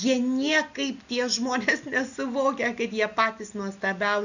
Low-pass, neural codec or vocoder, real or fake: 7.2 kHz; none; real